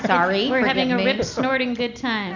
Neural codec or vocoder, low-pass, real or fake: none; 7.2 kHz; real